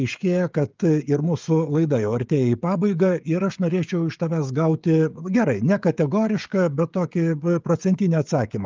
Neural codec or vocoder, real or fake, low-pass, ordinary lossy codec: codec, 16 kHz, 16 kbps, FreqCodec, smaller model; fake; 7.2 kHz; Opus, 32 kbps